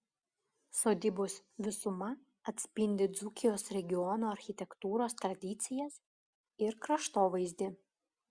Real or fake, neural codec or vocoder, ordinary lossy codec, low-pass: real; none; Opus, 64 kbps; 9.9 kHz